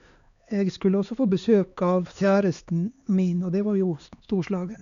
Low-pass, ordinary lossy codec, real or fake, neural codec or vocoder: 7.2 kHz; none; fake; codec, 16 kHz, 2 kbps, X-Codec, HuBERT features, trained on LibriSpeech